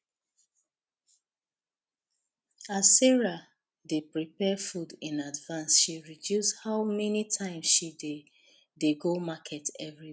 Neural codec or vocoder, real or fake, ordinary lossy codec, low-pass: none; real; none; none